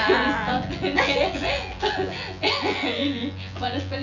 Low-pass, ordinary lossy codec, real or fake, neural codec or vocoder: 7.2 kHz; none; fake; vocoder, 24 kHz, 100 mel bands, Vocos